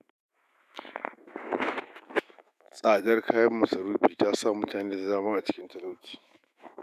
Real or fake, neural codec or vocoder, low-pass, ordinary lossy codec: fake; autoencoder, 48 kHz, 128 numbers a frame, DAC-VAE, trained on Japanese speech; 14.4 kHz; none